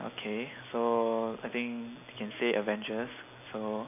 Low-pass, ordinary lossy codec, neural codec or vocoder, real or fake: 3.6 kHz; none; none; real